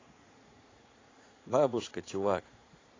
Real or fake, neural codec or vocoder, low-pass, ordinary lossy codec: fake; vocoder, 44.1 kHz, 80 mel bands, Vocos; 7.2 kHz; AAC, 32 kbps